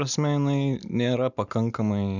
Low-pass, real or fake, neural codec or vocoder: 7.2 kHz; real; none